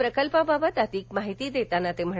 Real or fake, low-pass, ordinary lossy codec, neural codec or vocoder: real; none; none; none